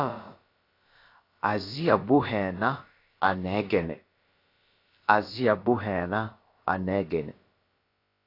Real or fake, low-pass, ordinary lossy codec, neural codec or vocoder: fake; 5.4 kHz; AAC, 32 kbps; codec, 16 kHz, about 1 kbps, DyCAST, with the encoder's durations